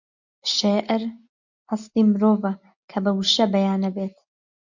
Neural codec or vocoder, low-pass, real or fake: none; 7.2 kHz; real